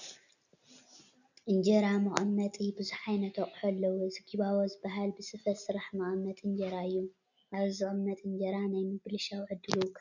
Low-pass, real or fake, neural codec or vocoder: 7.2 kHz; real; none